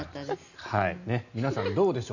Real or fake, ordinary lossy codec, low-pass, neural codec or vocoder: real; none; 7.2 kHz; none